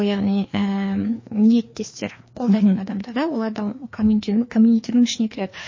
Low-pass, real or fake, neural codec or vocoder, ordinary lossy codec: 7.2 kHz; fake; codec, 16 kHz, 2 kbps, FreqCodec, larger model; MP3, 32 kbps